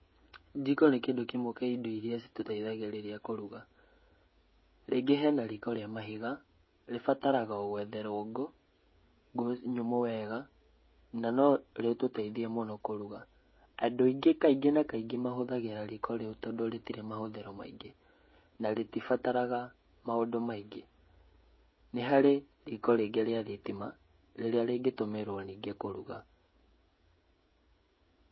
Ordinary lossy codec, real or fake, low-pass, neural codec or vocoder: MP3, 24 kbps; fake; 7.2 kHz; codec, 16 kHz, 16 kbps, FreqCodec, smaller model